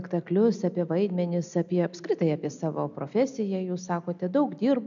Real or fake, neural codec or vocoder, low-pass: real; none; 7.2 kHz